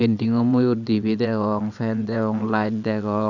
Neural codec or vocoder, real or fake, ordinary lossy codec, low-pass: vocoder, 22.05 kHz, 80 mel bands, WaveNeXt; fake; none; 7.2 kHz